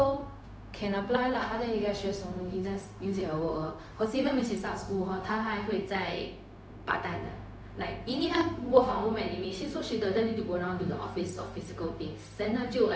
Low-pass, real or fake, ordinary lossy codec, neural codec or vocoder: none; fake; none; codec, 16 kHz, 0.4 kbps, LongCat-Audio-Codec